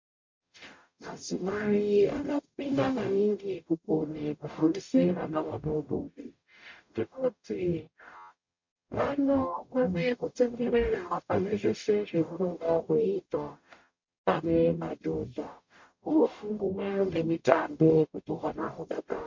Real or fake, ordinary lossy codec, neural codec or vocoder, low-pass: fake; MP3, 48 kbps; codec, 44.1 kHz, 0.9 kbps, DAC; 7.2 kHz